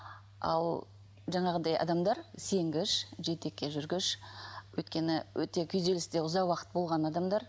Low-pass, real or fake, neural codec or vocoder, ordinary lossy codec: none; real; none; none